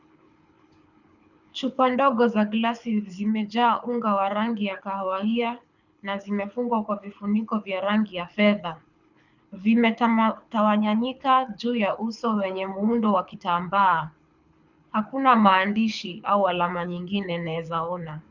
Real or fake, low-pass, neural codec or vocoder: fake; 7.2 kHz; codec, 24 kHz, 6 kbps, HILCodec